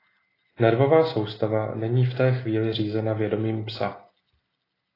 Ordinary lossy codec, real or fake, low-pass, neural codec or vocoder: AAC, 24 kbps; real; 5.4 kHz; none